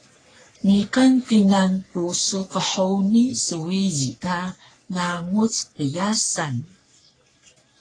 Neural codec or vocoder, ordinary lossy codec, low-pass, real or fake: codec, 44.1 kHz, 3.4 kbps, Pupu-Codec; AAC, 32 kbps; 9.9 kHz; fake